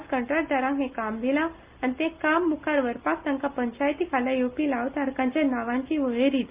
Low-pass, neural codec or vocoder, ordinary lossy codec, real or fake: 3.6 kHz; none; Opus, 24 kbps; real